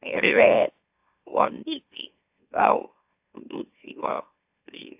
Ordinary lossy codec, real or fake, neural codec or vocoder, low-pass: AAC, 32 kbps; fake; autoencoder, 44.1 kHz, a latent of 192 numbers a frame, MeloTTS; 3.6 kHz